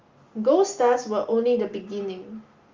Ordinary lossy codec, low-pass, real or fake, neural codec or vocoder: Opus, 32 kbps; 7.2 kHz; real; none